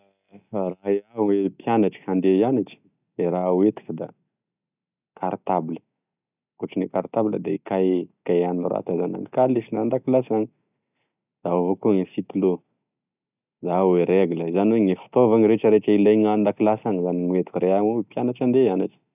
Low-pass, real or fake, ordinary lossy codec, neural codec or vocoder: 3.6 kHz; real; none; none